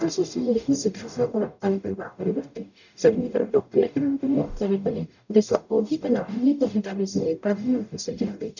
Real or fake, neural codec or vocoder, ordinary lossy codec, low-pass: fake; codec, 44.1 kHz, 0.9 kbps, DAC; none; 7.2 kHz